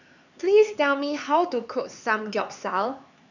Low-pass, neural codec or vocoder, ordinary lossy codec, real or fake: 7.2 kHz; codec, 16 kHz, 4 kbps, X-Codec, HuBERT features, trained on LibriSpeech; none; fake